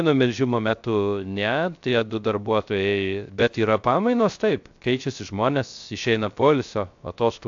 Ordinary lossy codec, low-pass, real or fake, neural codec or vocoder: AAC, 64 kbps; 7.2 kHz; fake; codec, 16 kHz, 0.3 kbps, FocalCodec